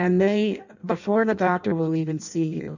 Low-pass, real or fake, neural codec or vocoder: 7.2 kHz; fake; codec, 16 kHz in and 24 kHz out, 0.6 kbps, FireRedTTS-2 codec